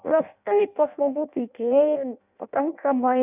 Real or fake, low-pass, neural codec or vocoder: fake; 3.6 kHz; codec, 16 kHz in and 24 kHz out, 0.6 kbps, FireRedTTS-2 codec